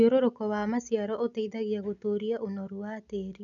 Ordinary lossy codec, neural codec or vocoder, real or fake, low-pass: none; none; real; 7.2 kHz